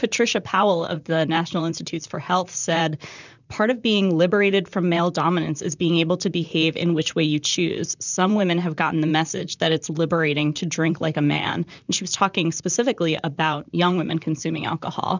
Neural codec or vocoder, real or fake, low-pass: vocoder, 44.1 kHz, 128 mel bands, Pupu-Vocoder; fake; 7.2 kHz